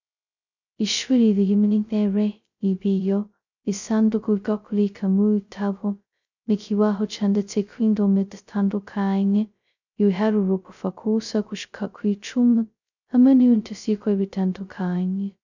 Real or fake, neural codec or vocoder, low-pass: fake; codec, 16 kHz, 0.2 kbps, FocalCodec; 7.2 kHz